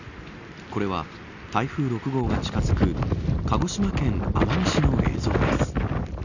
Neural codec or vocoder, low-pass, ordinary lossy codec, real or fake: none; 7.2 kHz; none; real